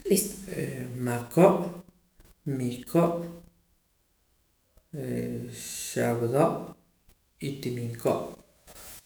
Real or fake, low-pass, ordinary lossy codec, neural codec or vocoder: fake; none; none; autoencoder, 48 kHz, 128 numbers a frame, DAC-VAE, trained on Japanese speech